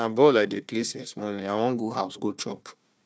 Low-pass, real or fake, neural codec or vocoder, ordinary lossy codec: none; fake; codec, 16 kHz, 1 kbps, FunCodec, trained on Chinese and English, 50 frames a second; none